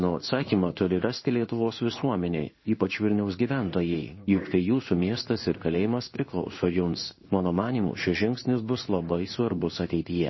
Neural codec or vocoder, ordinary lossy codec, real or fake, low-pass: codec, 16 kHz in and 24 kHz out, 1 kbps, XY-Tokenizer; MP3, 24 kbps; fake; 7.2 kHz